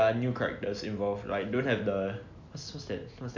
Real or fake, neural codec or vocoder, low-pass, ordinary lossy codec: real; none; 7.2 kHz; none